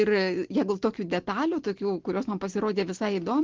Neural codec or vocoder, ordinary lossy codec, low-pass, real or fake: none; Opus, 16 kbps; 7.2 kHz; real